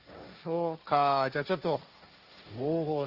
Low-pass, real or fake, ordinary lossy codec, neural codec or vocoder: 5.4 kHz; fake; Opus, 24 kbps; codec, 16 kHz, 1.1 kbps, Voila-Tokenizer